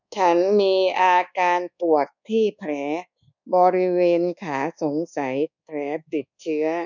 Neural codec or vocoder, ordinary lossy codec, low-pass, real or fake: codec, 24 kHz, 1.2 kbps, DualCodec; none; 7.2 kHz; fake